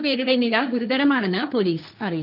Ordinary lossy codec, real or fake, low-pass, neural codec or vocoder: none; fake; 5.4 kHz; codec, 16 kHz, 1.1 kbps, Voila-Tokenizer